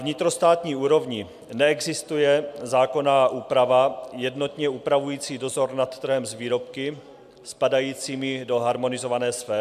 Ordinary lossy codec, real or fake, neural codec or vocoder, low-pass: MP3, 96 kbps; real; none; 14.4 kHz